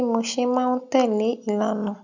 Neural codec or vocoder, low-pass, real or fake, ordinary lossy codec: none; 7.2 kHz; real; none